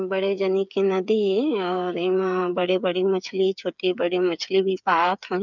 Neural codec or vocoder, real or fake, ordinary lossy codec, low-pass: codec, 16 kHz, 8 kbps, FreqCodec, smaller model; fake; none; 7.2 kHz